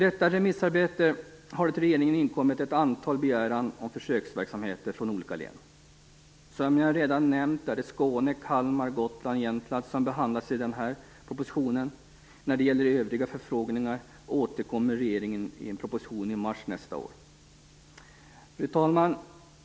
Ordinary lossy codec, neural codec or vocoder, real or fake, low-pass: none; none; real; none